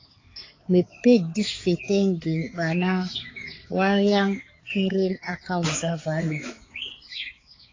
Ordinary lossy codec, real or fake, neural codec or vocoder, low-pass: AAC, 32 kbps; fake; codec, 16 kHz, 4 kbps, X-Codec, HuBERT features, trained on balanced general audio; 7.2 kHz